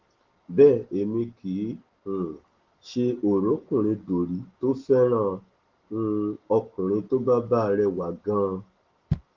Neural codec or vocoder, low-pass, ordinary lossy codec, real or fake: none; 7.2 kHz; Opus, 16 kbps; real